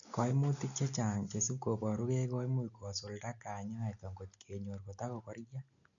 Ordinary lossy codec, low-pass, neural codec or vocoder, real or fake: none; 7.2 kHz; none; real